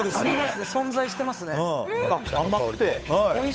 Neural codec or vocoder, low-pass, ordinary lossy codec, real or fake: codec, 16 kHz, 8 kbps, FunCodec, trained on Chinese and English, 25 frames a second; none; none; fake